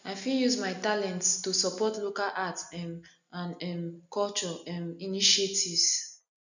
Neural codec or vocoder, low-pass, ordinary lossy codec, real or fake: none; 7.2 kHz; none; real